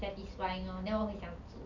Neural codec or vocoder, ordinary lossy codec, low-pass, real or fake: none; none; 7.2 kHz; real